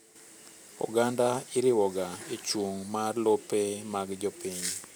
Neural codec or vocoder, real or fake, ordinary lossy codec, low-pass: vocoder, 44.1 kHz, 128 mel bands every 512 samples, BigVGAN v2; fake; none; none